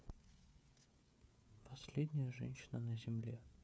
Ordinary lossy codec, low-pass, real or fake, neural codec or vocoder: none; none; fake; codec, 16 kHz, 16 kbps, FreqCodec, smaller model